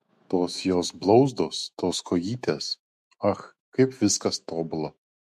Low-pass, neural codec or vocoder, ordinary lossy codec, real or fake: 14.4 kHz; autoencoder, 48 kHz, 128 numbers a frame, DAC-VAE, trained on Japanese speech; MP3, 64 kbps; fake